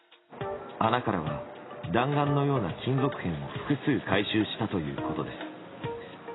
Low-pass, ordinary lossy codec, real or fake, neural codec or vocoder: 7.2 kHz; AAC, 16 kbps; real; none